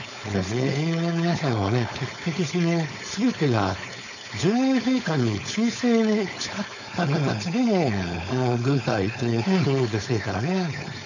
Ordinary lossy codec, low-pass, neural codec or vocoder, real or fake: none; 7.2 kHz; codec, 16 kHz, 4.8 kbps, FACodec; fake